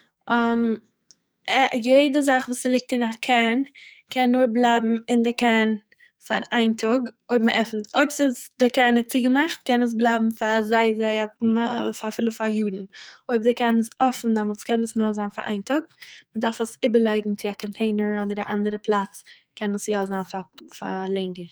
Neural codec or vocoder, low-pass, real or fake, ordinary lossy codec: codec, 44.1 kHz, 2.6 kbps, SNAC; none; fake; none